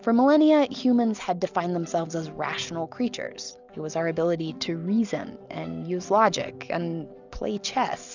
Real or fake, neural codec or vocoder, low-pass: real; none; 7.2 kHz